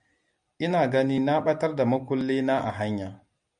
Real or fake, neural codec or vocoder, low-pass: real; none; 9.9 kHz